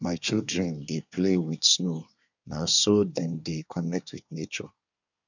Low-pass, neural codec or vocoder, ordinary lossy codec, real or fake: 7.2 kHz; codec, 24 kHz, 1 kbps, SNAC; none; fake